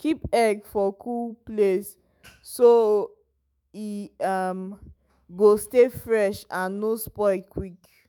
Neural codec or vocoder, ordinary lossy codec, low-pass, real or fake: autoencoder, 48 kHz, 128 numbers a frame, DAC-VAE, trained on Japanese speech; none; none; fake